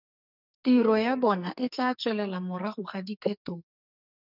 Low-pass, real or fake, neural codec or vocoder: 5.4 kHz; fake; codec, 44.1 kHz, 2.6 kbps, SNAC